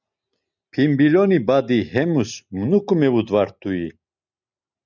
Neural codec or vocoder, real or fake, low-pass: none; real; 7.2 kHz